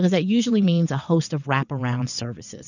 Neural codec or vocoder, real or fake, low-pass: vocoder, 22.05 kHz, 80 mel bands, WaveNeXt; fake; 7.2 kHz